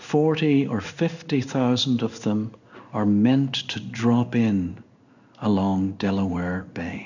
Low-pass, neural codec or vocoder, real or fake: 7.2 kHz; codec, 16 kHz in and 24 kHz out, 1 kbps, XY-Tokenizer; fake